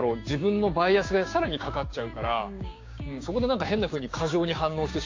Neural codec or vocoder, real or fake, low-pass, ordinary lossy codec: codec, 16 kHz, 6 kbps, DAC; fake; 7.2 kHz; AAC, 48 kbps